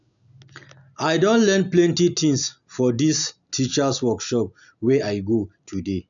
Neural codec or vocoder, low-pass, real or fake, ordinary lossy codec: none; 7.2 kHz; real; none